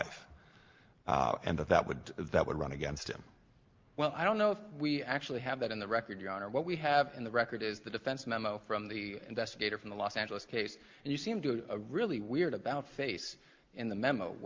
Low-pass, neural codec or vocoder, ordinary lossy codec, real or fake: 7.2 kHz; none; Opus, 32 kbps; real